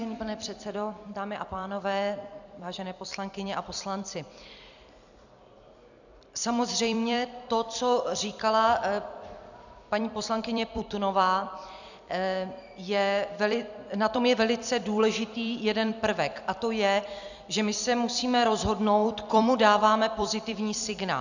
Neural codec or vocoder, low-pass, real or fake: vocoder, 44.1 kHz, 128 mel bands every 256 samples, BigVGAN v2; 7.2 kHz; fake